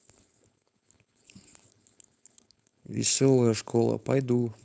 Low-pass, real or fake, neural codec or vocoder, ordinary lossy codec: none; fake; codec, 16 kHz, 4.8 kbps, FACodec; none